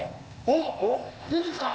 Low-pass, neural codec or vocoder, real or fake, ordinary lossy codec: none; codec, 16 kHz, 0.8 kbps, ZipCodec; fake; none